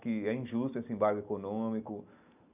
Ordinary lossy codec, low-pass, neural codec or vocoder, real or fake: none; 3.6 kHz; none; real